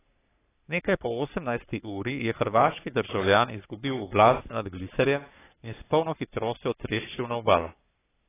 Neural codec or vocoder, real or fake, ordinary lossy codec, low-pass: codec, 44.1 kHz, 3.4 kbps, Pupu-Codec; fake; AAC, 16 kbps; 3.6 kHz